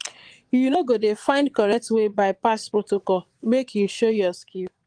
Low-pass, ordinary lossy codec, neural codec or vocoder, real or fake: 9.9 kHz; Opus, 24 kbps; vocoder, 22.05 kHz, 80 mel bands, Vocos; fake